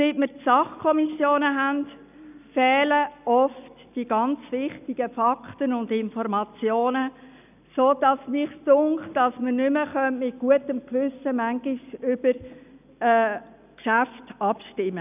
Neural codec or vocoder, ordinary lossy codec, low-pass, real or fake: none; none; 3.6 kHz; real